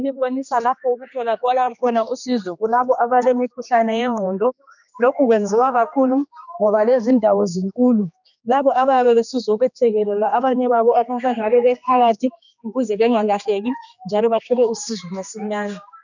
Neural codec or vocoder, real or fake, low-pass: codec, 16 kHz, 2 kbps, X-Codec, HuBERT features, trained on general audio; fake; 7.2 kHz